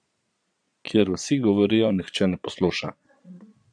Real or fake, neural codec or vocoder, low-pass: fake; vocoder, 22.05 kHz, 80 mel bands, Vocos; 9.9 kHz